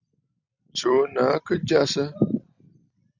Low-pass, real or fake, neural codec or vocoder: 7.2 kHz; fake; vocoder, 44.1 kHz, 128 mel bands every 256 samples, BigVGAN v2